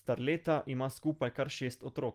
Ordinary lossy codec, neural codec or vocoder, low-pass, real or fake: Opus, 24 kbps; none; 14.4 kHz; real